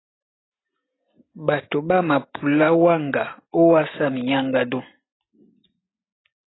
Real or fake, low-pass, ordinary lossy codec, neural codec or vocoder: real; 7.2 kHz; AAC, 16 kbps; none